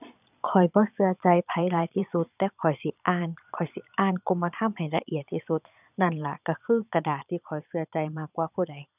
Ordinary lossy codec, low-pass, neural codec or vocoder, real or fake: none; 3.6 kHz; vocoder, 22.05 kHz, 80 mel bands, Vocos; fake